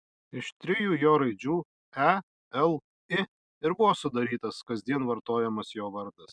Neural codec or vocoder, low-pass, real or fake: none; 9.9 kHz; real